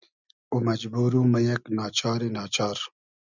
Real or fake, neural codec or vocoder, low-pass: real; none; 7.2 kHz